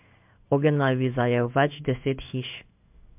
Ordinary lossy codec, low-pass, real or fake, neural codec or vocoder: MP3, 32 kbps; 3.6 kHz; fake; codec, 16 kHz in and 24 kHz out, 1 kbps, XY-Tokenizer